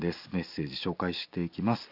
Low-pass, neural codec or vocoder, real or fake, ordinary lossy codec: 5.4 kHz; none; real; Opus, 64 kbps